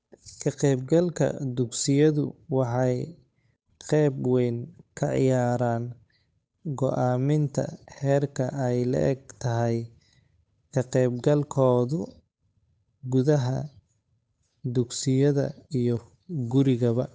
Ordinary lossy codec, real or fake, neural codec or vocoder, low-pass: none; fake; codec, 16 kHz, 8 kbps, FunCodec, trained on Chinese and English, 25 frames a second; none